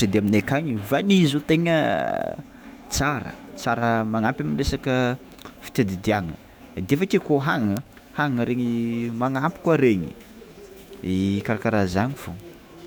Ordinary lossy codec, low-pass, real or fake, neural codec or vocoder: none; none; fake; autoencoder, 48 kHz, 128 numbers a frame, DAC-VAE, trained on Japanese speech